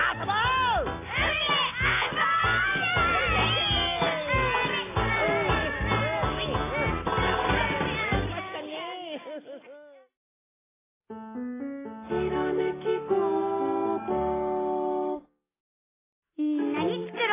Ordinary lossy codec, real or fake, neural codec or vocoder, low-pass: AAC, 16 kbps; real; none; 3.6 kHz